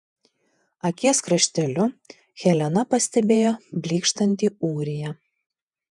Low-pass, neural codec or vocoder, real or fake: 10.8 kHz; vocoder, 48 kHz, 128 mel bands, Vocos; fake